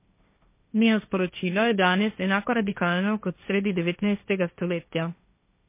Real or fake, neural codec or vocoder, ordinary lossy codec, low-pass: fake; codec, 16 kHz, 1.1 kbps, Voila-Tokenizer; MP3, 24 kbps; 3.6 kHz